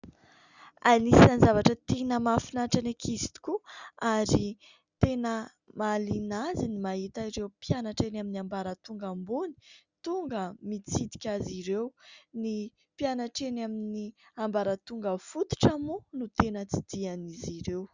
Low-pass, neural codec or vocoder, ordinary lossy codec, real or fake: 7.2 kHz; none; Opus, 64 kbps; real